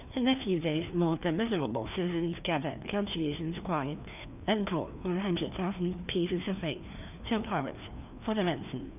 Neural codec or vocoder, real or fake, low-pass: codec, 16 kHz, 2 kbps, FreqCodec, larger model; fake; 3.6 kHz